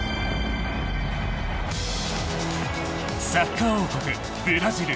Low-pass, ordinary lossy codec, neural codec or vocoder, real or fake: none; none; none; real